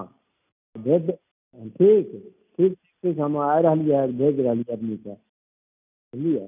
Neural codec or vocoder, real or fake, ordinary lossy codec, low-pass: none; real; none; 3.6 kHz